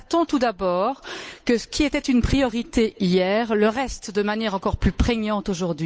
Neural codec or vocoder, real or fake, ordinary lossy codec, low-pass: codec, 16 kHz, 8 kbps, FunCodec, trained on Chinese and English, 25 frames a second; fake; none; none